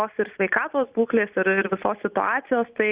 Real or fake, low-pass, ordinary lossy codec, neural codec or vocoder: real; 3.6 kHz; Opus, 64 kbps; none